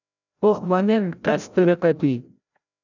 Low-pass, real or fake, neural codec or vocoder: 7.2 kHz; fake; codec, 16 kHz, 0.5 kbps, FreqCodec, larger model